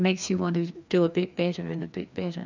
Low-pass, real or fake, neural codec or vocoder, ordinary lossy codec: 7.2 kHz; fake; codec, 16 kHz, 2 kbps, FreqCodec, larger model; MP3, 64 kbps